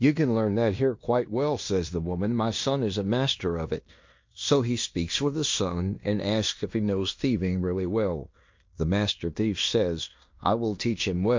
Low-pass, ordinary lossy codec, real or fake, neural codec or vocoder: 7.2 kHz; MP3, 48 kbps; fake; codec, 16 kHz in and 24 kHz out, 0.9 kbps, LongCat-Audio-Codec, fine tuned four codebook decoder